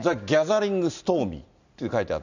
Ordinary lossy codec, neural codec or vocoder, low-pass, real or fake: none; none; 7.2 kHz; real